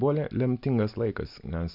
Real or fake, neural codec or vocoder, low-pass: real; none; 5.4 kHz